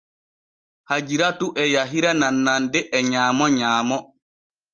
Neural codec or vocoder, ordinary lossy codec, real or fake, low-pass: none; Opus, 32 kbps; real; 9.9 kHz